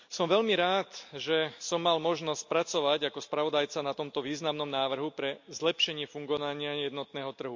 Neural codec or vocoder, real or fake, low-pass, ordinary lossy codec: none; real; 7.2 kHz; MP3, 48 kbps